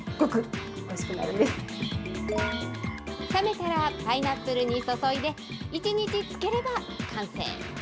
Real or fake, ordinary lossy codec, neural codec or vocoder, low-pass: real; none; none; none